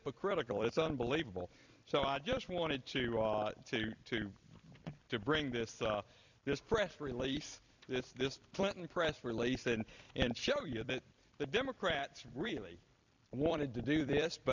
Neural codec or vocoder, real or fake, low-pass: vocoder, 44.1 kHz, 128 mel bands every 256 samples, BigVGAN v2; fake; 7.2 kHz